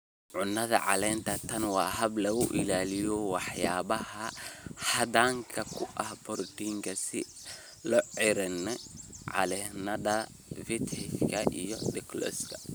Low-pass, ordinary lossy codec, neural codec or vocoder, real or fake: none; none; none; real